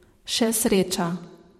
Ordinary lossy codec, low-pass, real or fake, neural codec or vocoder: MP3, 64 kbps; 19.8 kHz; fake; vocoder, 44.1 kHz, 128 mel bands every 512 samples, BigVGAN v2